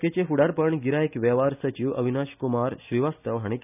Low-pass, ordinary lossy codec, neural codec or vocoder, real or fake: 3.6 kHz; none; none; real